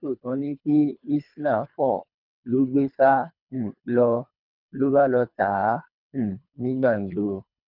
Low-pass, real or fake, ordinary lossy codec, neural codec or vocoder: 5.4 kHz; fake; none; codec, 24 kHz, 3 kbps, HILCodec